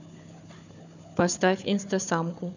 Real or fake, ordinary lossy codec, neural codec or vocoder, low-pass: fake; none; codec, 16 kHz, 4 kbps, FunCodec, trained on Chinese and English, 50 frames a second; 7.2 kHz